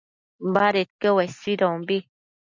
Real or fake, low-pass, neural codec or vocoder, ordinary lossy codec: real; 7.2 kHz; none; MP3, 64 kbps